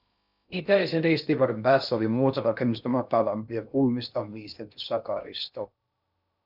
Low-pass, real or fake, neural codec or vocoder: 5.4 kHz; fake; codec, 16 kHz in and 24 kHz out, 0.6 kbps, FocalCodec, streaming, 2048 codes